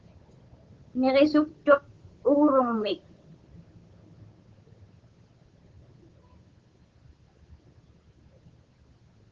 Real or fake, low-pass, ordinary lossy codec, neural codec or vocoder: fake; 7.2 kHz; Opus, 16 kbps; codec, 16 kHz, 8 kbps, FunCodec, trained on Chinese and English, 25 frames a second